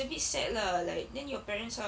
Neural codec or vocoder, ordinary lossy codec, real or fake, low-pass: none; none; real; none